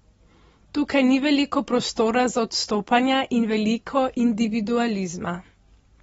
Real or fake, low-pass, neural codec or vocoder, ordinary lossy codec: real; 10.8 kHz; none; AAC, 24 kbps